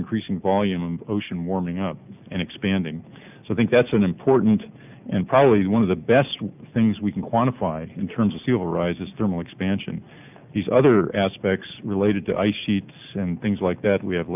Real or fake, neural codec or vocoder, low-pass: real; none; 3.6 kHz